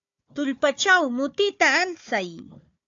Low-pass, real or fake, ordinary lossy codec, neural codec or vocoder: 7.2 kHz; fake; AAC, 64 kbps; codec, 16 kHz, 4 kbps, FunCodec, trained on Chinese and English, 50 frames a second